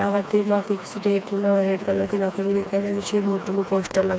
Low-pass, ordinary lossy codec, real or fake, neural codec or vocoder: none; none; fake; codec, 16 kHz, 2 kbps, FreqCodec, smaller model